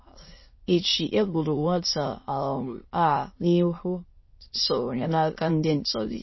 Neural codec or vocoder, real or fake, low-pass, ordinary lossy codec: autoencoder, 22.05 kHz, a latent of 192 numbers a frame, VITS, trained on many speakers; fake; 7.2 kHz; MP3, 24 kbps